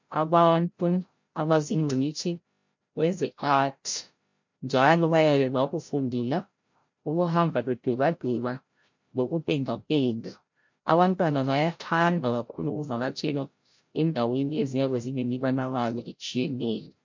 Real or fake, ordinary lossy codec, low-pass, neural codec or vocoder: fake; MP3, 48 kbps; 7.2 kHz; codec, 16 kHz, 0.5 kbps, FreqCodec, larger model